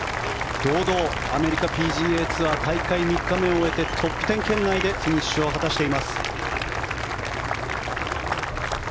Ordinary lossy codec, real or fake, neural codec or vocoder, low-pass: none; real; none; none